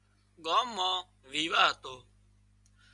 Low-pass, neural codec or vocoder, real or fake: 10.8 kHz; none; real